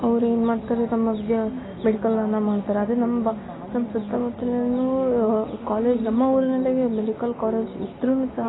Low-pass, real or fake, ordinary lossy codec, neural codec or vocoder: 7.2 kHz; real; AAC, 16 kbps; none